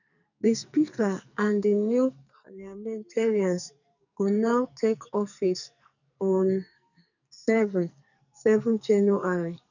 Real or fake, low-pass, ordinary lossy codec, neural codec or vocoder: fake; 7.2 kHz; none; codec, 44.1 kHz, 2.6 kbps, SNAC